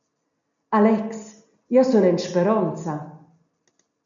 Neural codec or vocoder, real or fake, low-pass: none; real; 7.2 kHz